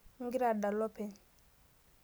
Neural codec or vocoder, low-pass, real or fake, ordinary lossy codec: none; none; real; none